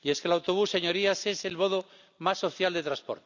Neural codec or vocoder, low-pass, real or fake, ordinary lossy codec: none; 7.2 kHz; real; none